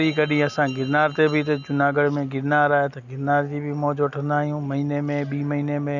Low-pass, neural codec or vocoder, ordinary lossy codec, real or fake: 7.2 kHz; none; none; real